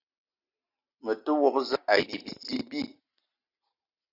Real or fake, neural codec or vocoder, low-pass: real; none; 5.4 kHz